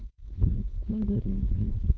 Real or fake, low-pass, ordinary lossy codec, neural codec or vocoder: fake; none; none; codec, 16 kHz, 4.8 kbps, FACodec